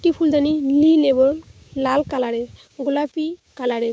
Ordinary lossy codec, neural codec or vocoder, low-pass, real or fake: none; codec, 16 kHz, 6 kbps, DAC; none; fake